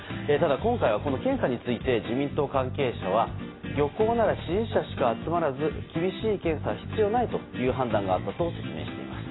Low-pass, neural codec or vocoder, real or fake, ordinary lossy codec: 7.2 kHz; none; real; AAC, 16 kbps